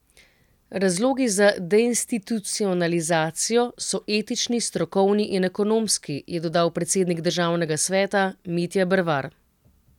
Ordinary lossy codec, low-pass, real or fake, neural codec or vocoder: none; 19.8 kHz; real; none